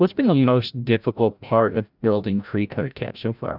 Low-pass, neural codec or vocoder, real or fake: 5.4 kHz; codec, 16 kHz, 0.5 kbps, FreqCodec, larger model; fake